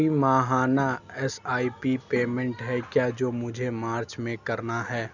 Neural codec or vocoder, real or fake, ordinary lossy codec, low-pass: none; real; none; 7.2 kHz